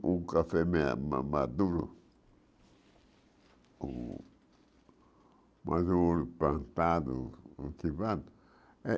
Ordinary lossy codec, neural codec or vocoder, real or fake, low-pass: none; none; real; none